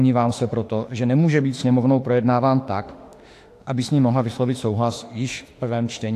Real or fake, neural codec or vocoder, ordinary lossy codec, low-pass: fake; autoencoder, 48 kHz, 32 numbers a frame, DAC-VAE, trained on Japanese speech; AAC, 64 kbps; 14.4 kHz